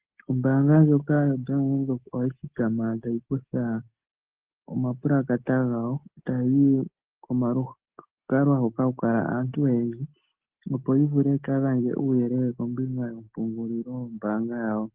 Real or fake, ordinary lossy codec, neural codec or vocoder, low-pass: real; Opus, 16 kbps; none; 3.6 kHz